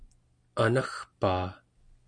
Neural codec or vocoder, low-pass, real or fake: none; 9.9 kHz; real